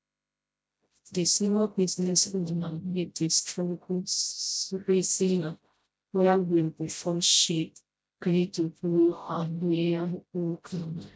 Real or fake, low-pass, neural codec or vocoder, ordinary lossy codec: fake; none; codec, 16 kHz, 0.5 kbps, FreqCodec, smaller model; none